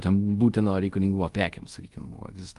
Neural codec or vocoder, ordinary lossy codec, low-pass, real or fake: codec, 24 kHz, 0.5 kbps, DualCodec; Opus, 32 kbps; 10.8 kHz; fake